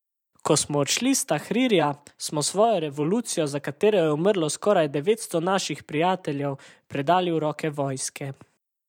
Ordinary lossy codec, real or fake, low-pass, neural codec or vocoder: none; fake; 19.8 kHz; vocoder, 44.1 kHz, 128 mel bands every 512 samples, BigVGAN v2